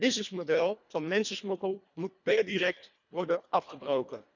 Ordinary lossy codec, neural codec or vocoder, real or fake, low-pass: none; codec, 24 kHz, 1.5 kbps, HILCodec; fake; 7.2 kHz